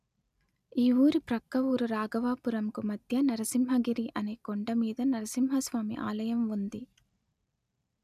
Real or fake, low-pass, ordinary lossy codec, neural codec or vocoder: real; 14.4 kHz; none; none